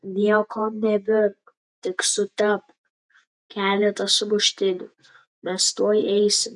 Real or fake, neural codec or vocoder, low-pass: fake; vocoder, 48 kHz, 128 mel bands, Vocos; 10.8 kHz